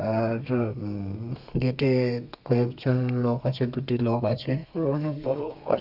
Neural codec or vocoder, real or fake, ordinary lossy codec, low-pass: codec, 32 kHz, 1.9 kbps, SNAC; fake; none; 5.4 kHz